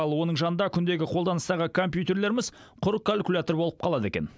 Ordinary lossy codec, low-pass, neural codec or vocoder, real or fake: none; none; none; real